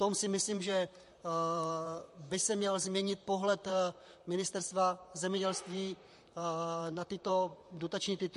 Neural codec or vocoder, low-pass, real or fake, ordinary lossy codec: vocoder, 44.1 kHz, 128 mel bands, Pupu-Vocoder; 14.4 kHz; fake; MP3, 48 kbps